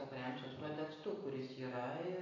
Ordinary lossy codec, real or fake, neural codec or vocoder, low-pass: AAC, 32 kbps; real; none; 7.2 kHz